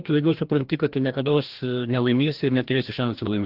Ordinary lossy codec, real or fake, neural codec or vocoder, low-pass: Opus, 16 kbps; fake; codec, 16 kHz, 1 kbps, FreqCodec, larger model; 5.4 kHz